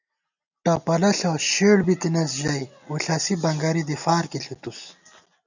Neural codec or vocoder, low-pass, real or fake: none; 7.2 kHz; real